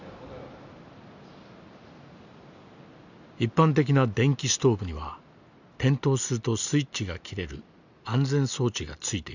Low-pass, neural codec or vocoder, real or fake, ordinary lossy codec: 7.2 kHz; none; real; none